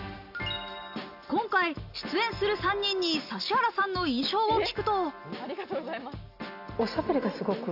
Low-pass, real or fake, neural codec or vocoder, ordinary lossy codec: 5.4 kHz; real; none; AAC, 48 kbps